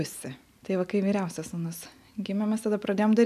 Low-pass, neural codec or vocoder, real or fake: 14.4 kHz; none; real